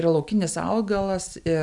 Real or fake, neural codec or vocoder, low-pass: real; none; 10.8 kHz